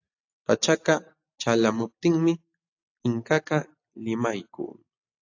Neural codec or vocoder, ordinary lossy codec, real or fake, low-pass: none; AAC, 48 kbps; real; 7.2 kHz